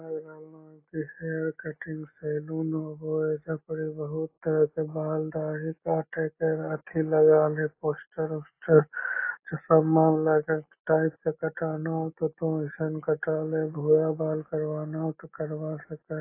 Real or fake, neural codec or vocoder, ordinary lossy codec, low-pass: real; none; AAC, 24 kbps; 3.6 kHz